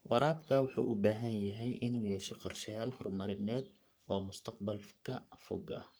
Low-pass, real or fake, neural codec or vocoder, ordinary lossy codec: none; fake; codec, 44.1 kHz, 3.4 kbps, Pupu-Codec; none